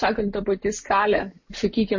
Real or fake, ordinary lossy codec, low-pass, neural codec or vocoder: real; MP3, 32 kbps; 7.2 kHz; none